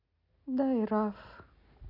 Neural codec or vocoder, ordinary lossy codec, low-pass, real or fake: none; none; 5.4 kHz; real